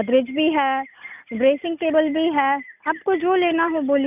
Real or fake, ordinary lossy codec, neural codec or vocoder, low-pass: real; none; none; 3.6 kHz